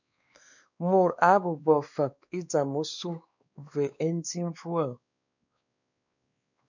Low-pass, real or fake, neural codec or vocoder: 7.2 kHz; fake; codec, 16 kHz, 2 kbps, X-Codec, WavLM features, trained on Multilingual LibriSpeech